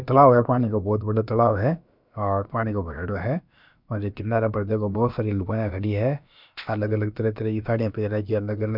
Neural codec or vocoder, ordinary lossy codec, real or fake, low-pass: codec, 16 kHz, about 1 kbps, DyCAST, with the encoder's durations; none; fake; 5.4 kHz